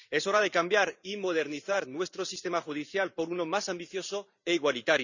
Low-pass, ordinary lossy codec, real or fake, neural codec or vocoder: 7.2 kHz; none; fake; vocoder, 44.1 kHz, 128 mel bands every 512 samples, BigVGAN v2